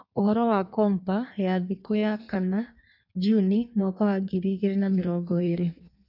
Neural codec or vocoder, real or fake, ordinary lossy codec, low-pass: codec, 16 kHz in and 24 kHz out, 1.1 kbps, FireRedTTS-2 codec; fake; none; 5.4 kHz